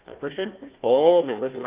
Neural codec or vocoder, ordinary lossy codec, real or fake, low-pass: codec, 16 kHz, 1 kbps, FunCodec, trained on Chinese and English, 50 frames a second; Opus, 32 kbps; fake; 3.6 kHz